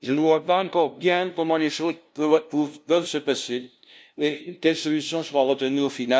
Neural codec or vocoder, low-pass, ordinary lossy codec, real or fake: codec, 16 kHz, 0.5 kbps, FunCodec, trained on LibriTTS, 25 frames a second; none; none; fake